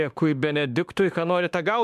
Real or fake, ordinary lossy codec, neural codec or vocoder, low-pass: fake; MP3, 96 kbps; autoencoder, 48 kHz, 32 numbers a frame, DAC-VAE, trained on Japanese speech; 14.4 kHz